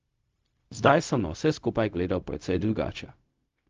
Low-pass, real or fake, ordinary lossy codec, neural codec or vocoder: 7.2 kHz; fake; Opus, 32 kbps; codec, 16 kHz, 0.4 kbps, LongCat-Audio-Codec